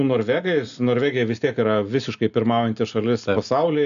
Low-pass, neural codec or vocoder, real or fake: 7.2 kHz; none; real